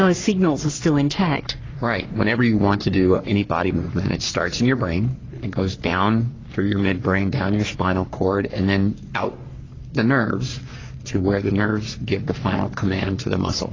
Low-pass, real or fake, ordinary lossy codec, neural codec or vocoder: 7.2 kHz; fake; AAC, 32 kbps; codec, 44.1 kHz, 3.4 kbps, Pupu-Codec